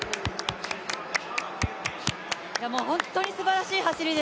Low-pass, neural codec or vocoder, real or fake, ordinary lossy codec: none; none; real; none